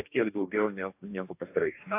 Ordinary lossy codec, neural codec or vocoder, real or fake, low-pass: AAC, 24 kbps; codec, 44.1 kHz, 2.6 kbps, DAC; fake; 3.6 kHz